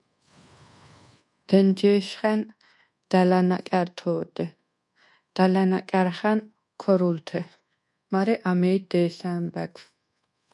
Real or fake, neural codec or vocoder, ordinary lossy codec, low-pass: fake; codec, 24 kHz, 1.2 kbps, DualCodec; MP3, 96 kbps; 10.8 kHz